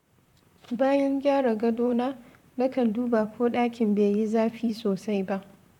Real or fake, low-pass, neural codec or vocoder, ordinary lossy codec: fake; 19.8 kHz; vocoder, 44.1 kHz, 128 mel bands, Pupu-Vocoder; none